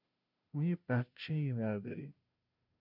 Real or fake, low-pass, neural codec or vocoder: fake; 5.4 kHz; codec, 16 kHz, 0.5 kbps, FunCodec, trained on Chinese and English, 25 frames a second